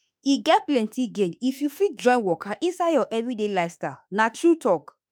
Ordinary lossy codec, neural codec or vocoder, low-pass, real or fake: none; autoencoder, 48 kHz, 32 numbers a frame, DAC-VAE, trained on Japanese speech; none; fake